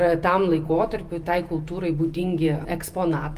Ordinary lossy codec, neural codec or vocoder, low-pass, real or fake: Opus, 32 kbps; vocoder, 48 kHz, 128 mel bands, Vocos; 14.4 kHz; fake